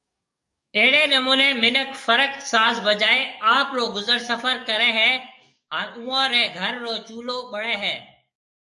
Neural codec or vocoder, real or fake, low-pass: codec, 44.1 kHz, 7.8 kbps, DAC; fake; 10.8 kHz